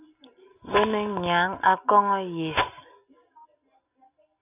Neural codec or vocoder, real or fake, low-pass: none; real; 3.6 kHz